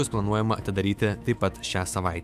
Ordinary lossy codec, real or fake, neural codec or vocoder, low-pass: AAC, 96 kbps; fake; autoencoder, 48 kHz, 128 numbers a frame, DAC-VAE, trained on Japanese speech; 14.4 kHz